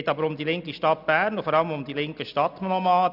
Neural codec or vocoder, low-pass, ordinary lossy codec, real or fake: none; 5.4 kHz; none; real